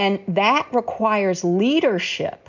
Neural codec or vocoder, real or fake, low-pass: none; real; 7.2 kHz